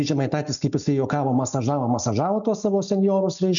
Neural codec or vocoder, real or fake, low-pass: none; real; 7.2 kHz